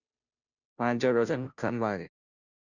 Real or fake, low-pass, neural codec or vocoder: fake; 7.2 kHz; codec, 16 kHz, 0.5 kbps, FunCodec, trained on Chinese and English, 25 frames a second